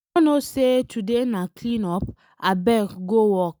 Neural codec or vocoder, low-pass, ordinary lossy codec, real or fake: none; none; none; real